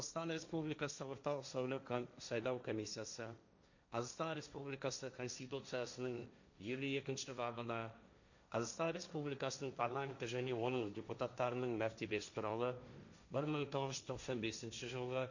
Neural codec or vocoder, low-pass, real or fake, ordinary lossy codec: codec, 16 kHz, 1.1 kbps, Voila-Tokenizer; 7.2 kHz; fake; none